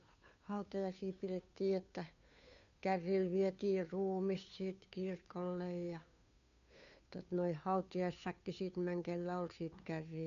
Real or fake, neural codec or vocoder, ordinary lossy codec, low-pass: fake; codec, 16 kHz, 2 kbps, FunCodec, trained on Chinese and English, 25 frames a second; none; 7.2 kHz